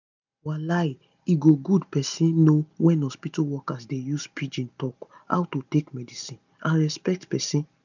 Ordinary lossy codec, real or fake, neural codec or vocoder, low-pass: none; real; none; 7.2 kHz